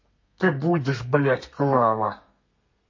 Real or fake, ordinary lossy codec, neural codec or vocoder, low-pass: fake; MP3, 32 kbps; codec, 32 kHz, 1.9 kbps, SNAC; 7.2 kHz